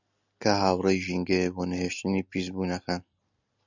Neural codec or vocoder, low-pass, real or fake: none; 7.2 kHz; real